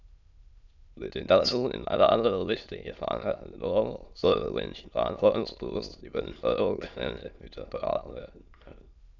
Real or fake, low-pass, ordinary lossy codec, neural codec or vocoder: fake; 7.2 kHz; none; autoencoder, 22.05 kHz, a latent of 192 numbers a frame, VITS, trained on many speakers